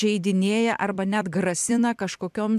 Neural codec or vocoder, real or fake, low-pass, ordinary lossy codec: vocoder, 44.1 kHz, 128 mel bands every 256 samples, BigVGAN v2; fake; 14.4 kHz; MP3, 96 kbps